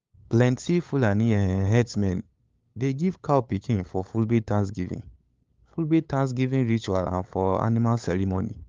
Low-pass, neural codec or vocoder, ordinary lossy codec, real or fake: 7.2 kHz; codec, 16 kHz, 8 kbps, FunCodec, trained on LibriTTS, 25 frames a second; Opus, 32 kbps; fake